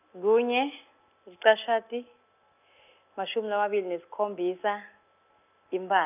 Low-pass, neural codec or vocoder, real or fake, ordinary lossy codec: 3.6 kHz; none; real; none